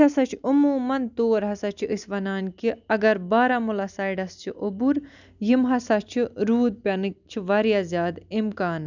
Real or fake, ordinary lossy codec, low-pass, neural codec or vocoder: real; none; 7.2 kHz; none